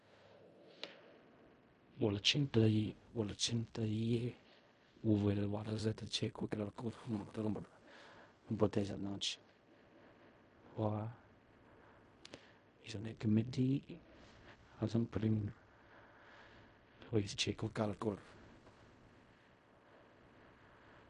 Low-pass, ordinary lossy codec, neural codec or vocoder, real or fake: 10.8 kHz; MP3, 48 kbps; codec, 16 kHz in and 24 kHz out, 0.4 kbps, LongCat-Audio-Codec, fine tuned four codebook decoder; fake